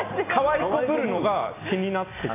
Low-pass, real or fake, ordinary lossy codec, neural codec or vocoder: 3.6 kHz; real; AAC, 16 kbps; none